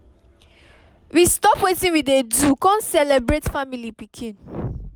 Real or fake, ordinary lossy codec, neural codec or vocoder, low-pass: real; none; none; none